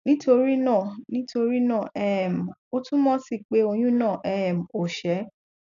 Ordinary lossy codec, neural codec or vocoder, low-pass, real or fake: none; none; 7.2 kHz; real